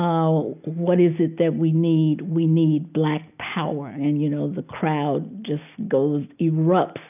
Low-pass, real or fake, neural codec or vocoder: 3.6 kHz; real; none